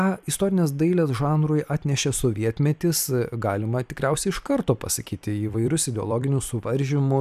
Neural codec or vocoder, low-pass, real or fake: vocoder, 44.1 kHz, 128 mel bands every 256 samples, BigVGAN v2; 14.4 kHz; fake